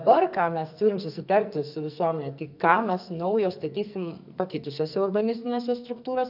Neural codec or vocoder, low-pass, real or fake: codec, 44.1 kHz, 2.6 kbps, SNAC; 5.4 kHz; fake